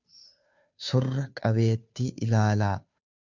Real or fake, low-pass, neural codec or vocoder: fake; 7.2 kHz; codec, 16 kHz, 2 kbps, FunCodec, trained on Chinese and English, 25 frames a second